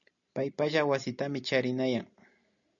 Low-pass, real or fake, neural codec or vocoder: 7.2 kHz; real; none